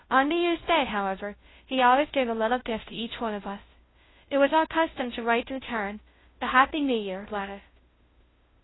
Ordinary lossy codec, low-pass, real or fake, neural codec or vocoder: AAC, 16 kbps; 7.2 kHz; fake; codec, 16 kHz, 0.5 kbps, FunCodec, trained on Chinese and English, 25 frames a second